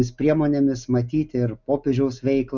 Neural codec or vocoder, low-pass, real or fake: none; 7.2 kHz; real